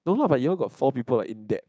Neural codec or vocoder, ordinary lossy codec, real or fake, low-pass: codec, 16 kHz, 6 kbps, DAC; none; fake; none